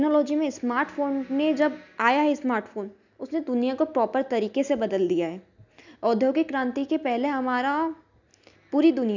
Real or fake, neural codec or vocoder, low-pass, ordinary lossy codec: real; none; 7.2 kHz; none